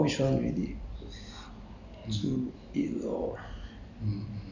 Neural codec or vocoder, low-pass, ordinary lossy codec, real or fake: none; 7.2 kHz; none; real